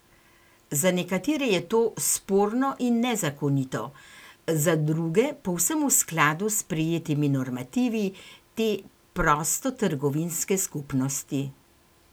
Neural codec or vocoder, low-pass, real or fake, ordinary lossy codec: none; none; real; none